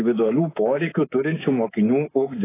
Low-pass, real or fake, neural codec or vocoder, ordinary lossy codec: 3.6 kHz; fake; codec, 24 kHz, 3.1 kbps, DualCodec; AAC, 16 kbps